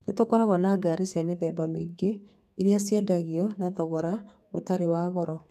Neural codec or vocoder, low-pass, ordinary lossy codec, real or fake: codec, 32 kHz, 1.9 kbps, SNAC; 14.4 kHz; none; fake